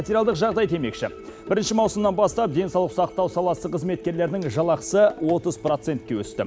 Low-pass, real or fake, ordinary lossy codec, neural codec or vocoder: none; real; none; none